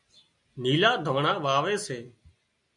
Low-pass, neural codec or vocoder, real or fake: 10.8 kHz; none; real